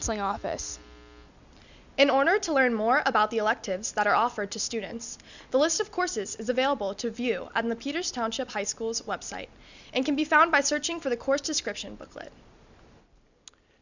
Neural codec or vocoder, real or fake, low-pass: none; real; 7.2 kHz